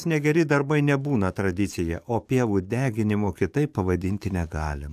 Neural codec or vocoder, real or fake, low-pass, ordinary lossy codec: codec, 44.1 kHz, 7.8 kbps, DAC; fake; 14.4 kHz; MP3, 96 kbps